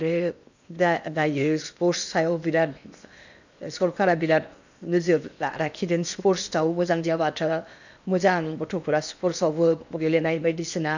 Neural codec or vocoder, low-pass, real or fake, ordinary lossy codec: codec, 16 kHz in and 24 kHz out, 0.8 kbps, FocalCodec, streaming, 65536 codes; 7.2 kHz; fake; none